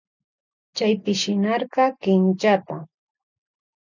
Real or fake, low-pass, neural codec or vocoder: real; 7.2 kHz; none